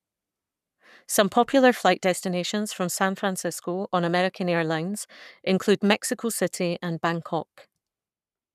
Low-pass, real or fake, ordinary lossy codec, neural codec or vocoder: 14.4 kHz; fake; none; codec, 44.1 kHz, 7.8 kbps, Pupu-Codec